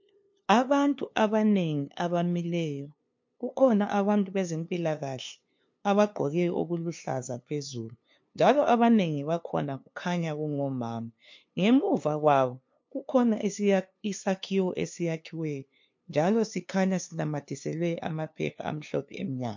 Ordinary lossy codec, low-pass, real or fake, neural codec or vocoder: MP3, 48 kbps; 7.2 kHz; fake; codec, 16 kHz, 2 kbps, FunCodec, trained on LibriTTS, 25 frames a second